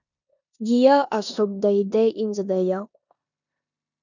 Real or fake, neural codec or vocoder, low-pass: fake; codec, 16 kHz in and 24 kHz out, 0.9 kbps, LongCat-Audio-Codec, four codebook decoder; 7.2 kHz